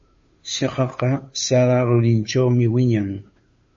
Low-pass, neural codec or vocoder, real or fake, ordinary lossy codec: 7.2 kHz; codec, 16 kHz, 2 kbps, FunCodec, trained on Chinese and English, 25 frames a second; fake; MP3, 32 kbps